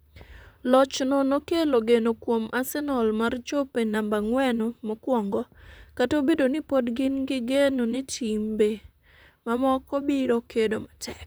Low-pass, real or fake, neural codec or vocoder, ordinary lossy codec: none; fake; vocoder, 44.1 kHz, 128 mel bands, Pupu-Vocoder; none